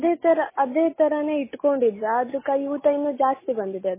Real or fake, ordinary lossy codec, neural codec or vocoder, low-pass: real; MP3, 16 kbps; none; 3.6 kHz